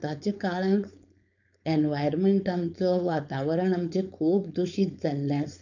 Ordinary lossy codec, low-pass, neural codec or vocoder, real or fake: none; 7.2 kHz; codec, 16 kHz, 4.8 kbps, FACodec; fake